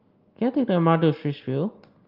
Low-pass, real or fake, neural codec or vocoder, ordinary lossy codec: 5.4 kHz; real; none; Opus, 32 kbps